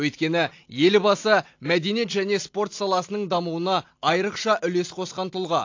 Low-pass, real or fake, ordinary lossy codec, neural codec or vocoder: 7.2 kHz; real; AAC, 48 kbps; none